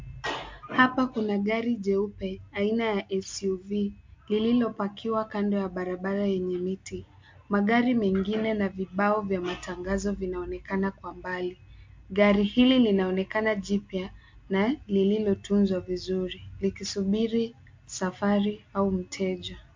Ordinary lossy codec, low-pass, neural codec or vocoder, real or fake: MP3, 48 kbps; 7.2 kHz; none; real